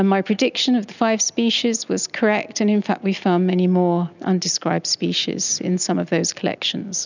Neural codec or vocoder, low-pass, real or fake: none; 7.2 kHz; real